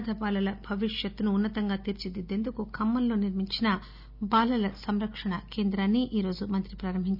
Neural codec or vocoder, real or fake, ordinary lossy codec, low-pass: none; real; none; 5.4 kHz